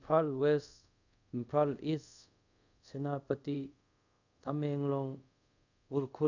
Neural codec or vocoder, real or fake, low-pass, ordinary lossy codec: codec, 24 kHz, 0.5 kbps, DualCodec; fake; 7.2 kHz; none